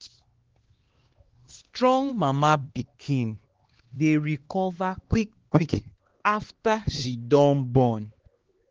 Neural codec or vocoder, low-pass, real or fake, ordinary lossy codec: codec, 16 kHz, 2 kbps, X-Codec, HuBERT features, trained on LibriSpeech; 7.2 kHz; fake; Opus, 16 kbps